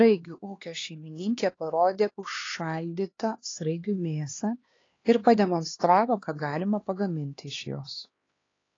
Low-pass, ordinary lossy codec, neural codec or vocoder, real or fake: 7.2 kHz; AAC, 32 kbps; codec, 16 kHz, 2 kbps, X-Codec, HuBERT features, trained on LibriSpeech; fake